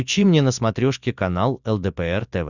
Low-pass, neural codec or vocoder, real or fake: 7.2 kHz; none; real